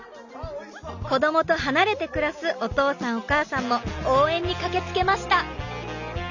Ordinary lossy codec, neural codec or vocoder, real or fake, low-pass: none; none; real; 7.2 kHz